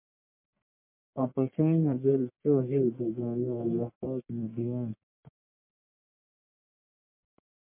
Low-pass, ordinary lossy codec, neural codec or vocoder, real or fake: 3.6 kHz; MP3, 32 kbps; codec, 44.1 kHz, 1.7 kbps, Pupu-Codec; fake